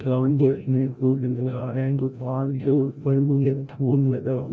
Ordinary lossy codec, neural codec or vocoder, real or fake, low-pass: none; codec, 16 kHz, 0.5 kbps, FreqCodec, larger model; fake; none